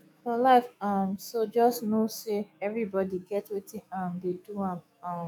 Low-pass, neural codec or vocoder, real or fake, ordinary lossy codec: none; autoencoder, 48 kHz, 128 numbers a frame, DAC-VAE, trained on Japanese speech; fake; none